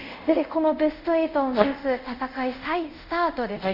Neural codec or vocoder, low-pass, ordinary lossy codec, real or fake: codec, 24 kHz, 0.5 kbps, DualCodec; 5.4 kHz; none; fake